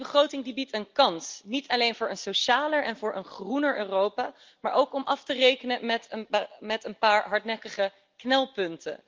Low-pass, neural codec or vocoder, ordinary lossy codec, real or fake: 7.2 kHz; none; Opus, 32 kbps; real